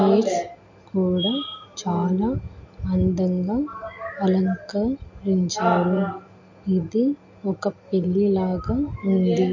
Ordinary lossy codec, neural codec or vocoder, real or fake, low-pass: MP3, 48 kbps; none; real; 7.2 kHz